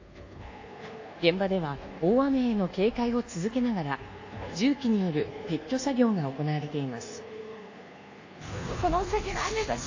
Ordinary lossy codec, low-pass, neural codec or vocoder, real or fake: AAC, 48 kbps; 7.2 kHz; codec, 24 kHz, 1.2 kbps, DualCodec; fake